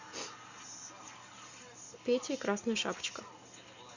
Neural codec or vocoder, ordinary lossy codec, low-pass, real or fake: none; Opus, 64 kbps; 7.2 kHz; real